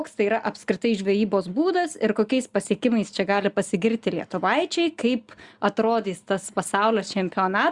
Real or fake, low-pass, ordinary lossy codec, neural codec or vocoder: real; 10.8 kHz; Opus, 64 kbps; none